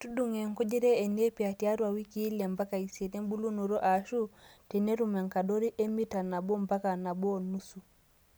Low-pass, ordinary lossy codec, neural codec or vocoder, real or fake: none; none; none; real